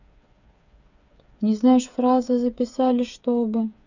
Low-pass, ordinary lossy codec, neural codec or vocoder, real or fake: 7.2 kHz; none; codec, 16 kHz, 8 kbps, FreqCodec, smaller model; fake